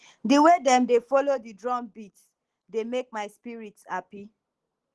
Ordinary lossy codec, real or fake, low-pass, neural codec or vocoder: Opus, 16 kbps; real; 10.8 kHz; none